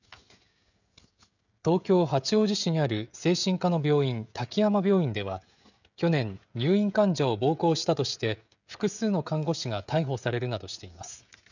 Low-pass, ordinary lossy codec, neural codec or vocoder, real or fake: 7.2 kHz; none; codec, 16 kHz, 16 kbps, FreqCodec, smaller model; fake